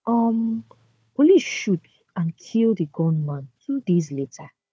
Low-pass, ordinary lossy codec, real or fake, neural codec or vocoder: none; none; fake; codec, 16 kHz, 4 kbps, FunCodec, trained on Chinese and English, 50 frames a second